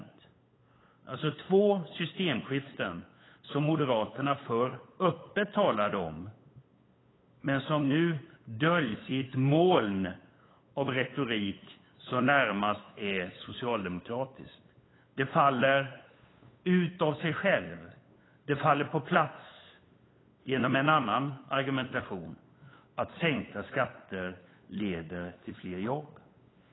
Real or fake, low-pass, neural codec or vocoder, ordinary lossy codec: fake; 7.2 kHz; codec, 16 kHz, 8 kbps, FunCodec, trained on LibriTTS, 25 frames a second; AAC, 16 kbps